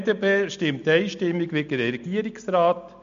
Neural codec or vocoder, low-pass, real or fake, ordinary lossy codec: none; 7.2 kHz; real; MP3, 48 kbps